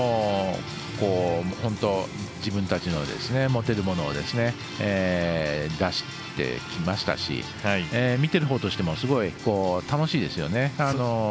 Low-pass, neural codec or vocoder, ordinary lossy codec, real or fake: none; none; none; real